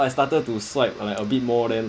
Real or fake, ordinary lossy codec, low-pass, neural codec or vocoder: real; none; none; none